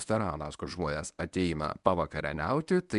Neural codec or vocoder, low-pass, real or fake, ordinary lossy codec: codec, 24 kHz, 0.9 kbps, WavTokenizer, medium speech release version 1; 10.8 kHz; fake; MP3, 96 kbps